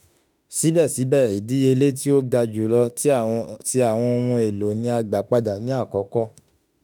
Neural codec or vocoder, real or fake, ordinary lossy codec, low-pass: autoencoder, 48 kHz, 32 numbers a frame, DAC-VAE, trained on Japanese speech; fake; none; none